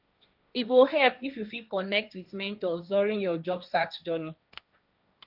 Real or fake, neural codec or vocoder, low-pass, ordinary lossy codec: fake; codec, 16 kHz, 1.1 kbps, Voila-Tokenizer; 5.4 kHz; none